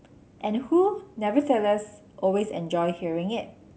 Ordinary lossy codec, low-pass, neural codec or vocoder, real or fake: none; none; none; real